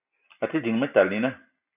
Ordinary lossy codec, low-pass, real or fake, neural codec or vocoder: AAC, 24 kbps; 3.6 kHz; real; none